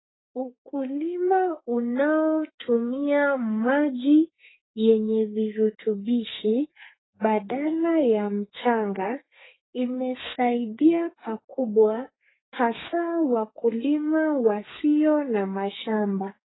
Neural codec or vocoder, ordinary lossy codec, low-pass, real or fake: codec, 32 kHz, 1.9 kbps, SNAC; AAC, 16 kbps; 7.2 kHz; fake